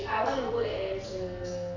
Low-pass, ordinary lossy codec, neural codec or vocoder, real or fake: 7.2 kHz; none; codec, 44.1 kHz, 2.6 kbps, SNAC; fake